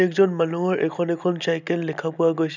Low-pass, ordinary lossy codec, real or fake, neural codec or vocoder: 7.2 kHz; none; real; none